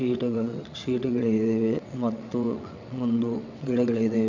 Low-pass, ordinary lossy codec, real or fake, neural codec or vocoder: 7.2 kHz; none; fake; vocoder, 22.05 kHz, 80 mel bands, WaveNeXt